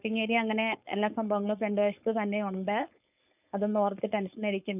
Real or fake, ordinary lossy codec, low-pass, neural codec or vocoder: fake; none; 3.6 kHz; codec, 16 kHz, 4.8 kbps, FACodec